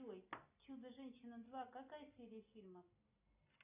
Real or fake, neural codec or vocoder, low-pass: real; none; 3.6 kHz